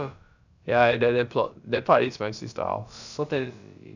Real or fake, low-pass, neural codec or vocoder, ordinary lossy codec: fake; 7.2 kHz; codec, 16 kHz, about 1 kbps, DyCAST, with the encoder's durations; none